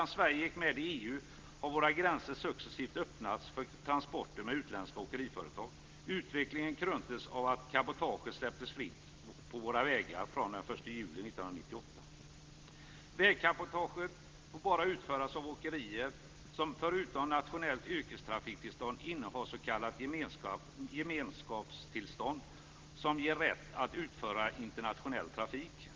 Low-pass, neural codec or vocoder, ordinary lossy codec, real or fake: 7.2 kHz; none; Opus, 16 kbps; real